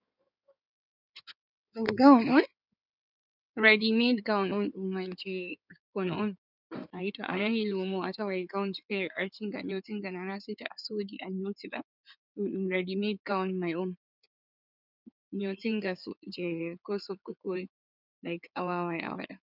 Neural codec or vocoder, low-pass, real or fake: codec, 16 kHz in and 24 kHz out, 2.2 kbps, FireRedTTS-2 codec; 5.4 kHz; fake